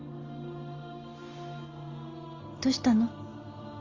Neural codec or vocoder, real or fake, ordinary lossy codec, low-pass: none; real; Opus, 32 kbps; 7.2 kHz